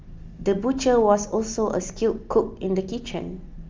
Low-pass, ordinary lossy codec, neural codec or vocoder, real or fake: 7.2 kHz; Opus, 32 kbps; none; real